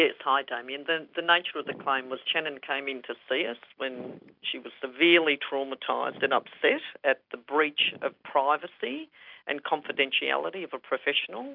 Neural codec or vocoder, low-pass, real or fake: none; 5.4 kHz; real